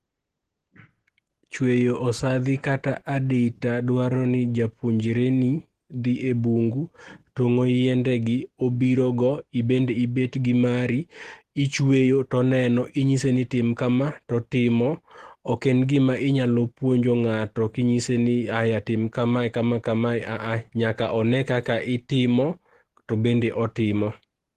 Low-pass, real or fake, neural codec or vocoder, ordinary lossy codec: 14.4 kHz; real; none; Opus, 16 kbps